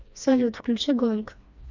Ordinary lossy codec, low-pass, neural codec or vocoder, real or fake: MP3, 64 kbps; 7.2 kHz; codec, 16 kHz, 2 kbps, FreqCodec, smaller model; fake